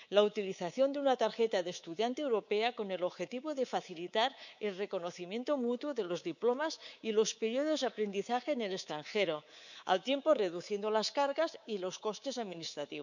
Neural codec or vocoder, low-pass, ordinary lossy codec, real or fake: codec, 24 kHz, 3.1 kbps, DualCodec; 7.2 kHz; none; fake